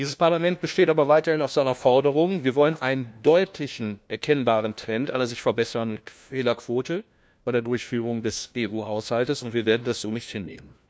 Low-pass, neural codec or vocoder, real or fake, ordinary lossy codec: none; codec, 16 kHz, 1 kbps, FunCodec, trained on LibriTTS, 50 frames a second; fake; none